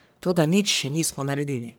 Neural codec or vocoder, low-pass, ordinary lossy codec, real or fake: codec, 44.1 kHz, 1.7 kbps, Pupu-Codec; none; none; fake